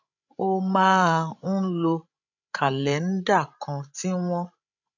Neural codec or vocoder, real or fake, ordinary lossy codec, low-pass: codec, 16 kHz, 16 kbps, FreqCodec, larger model; fake; none; 7.2 kHz